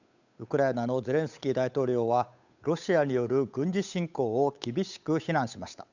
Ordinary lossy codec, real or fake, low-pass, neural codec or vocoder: none; fake; 7.2 kHz; codec, 16 kHz, 8 kbps, FunCodec, trained on Chinese and English, 25 frames a second